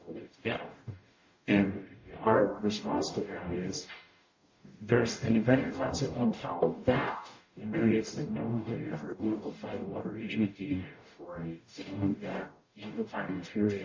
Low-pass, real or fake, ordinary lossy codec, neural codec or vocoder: 7.2 kHz; fake; MP3, 32 kbps; codec, 44.1 kHz, 0.9 kbps, DAC